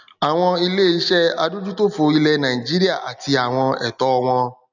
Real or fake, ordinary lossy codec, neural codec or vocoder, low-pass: real; none; none; 7.2 kHz